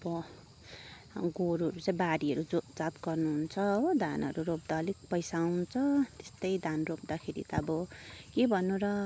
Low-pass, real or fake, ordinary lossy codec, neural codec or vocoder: none; real; none; none